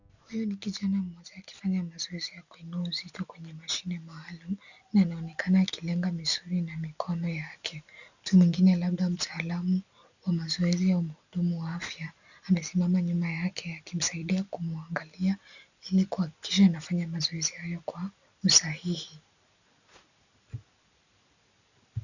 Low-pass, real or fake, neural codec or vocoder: 7.2 kHz; real; none